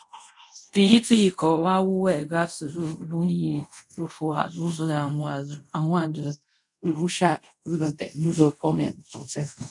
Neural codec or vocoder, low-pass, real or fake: codec, 24 kHz, 0.5 kbps, DualCodec; 10.8 kHz; fake